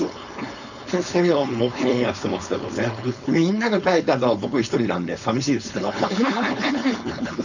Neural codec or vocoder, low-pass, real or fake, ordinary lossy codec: codec, 16 kHz, 4.8 kbps, FACodec; 7.2 kHz; fake; none